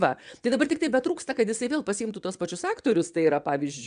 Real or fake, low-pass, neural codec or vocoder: fake; 9.9 kHz; vocoder, 22.05 kHz, 80 mel bands, WaveNeXt